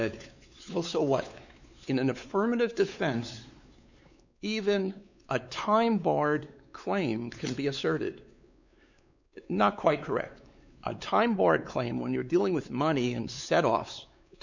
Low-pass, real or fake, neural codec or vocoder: 7.2 kHz; fake; codec, 16 kHz, 4 kbps, X-Codec, WavLM features, trained on Multilingual LibriSpeech